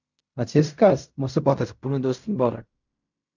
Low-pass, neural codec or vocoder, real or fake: 7.2 kHz; codec, 16 kHz in and 24 kHz out, 0.4 kbps, LongCat-Audio-Codec, fine tuned four codebook decoder; fake